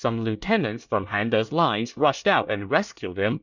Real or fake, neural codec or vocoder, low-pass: fake; codec, 24 kHz, 1 kbps, SNAC; 7.2 kHz